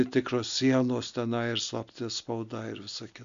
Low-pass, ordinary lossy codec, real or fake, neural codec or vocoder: 7.2 kHz; MP3, 64 kbps; real; none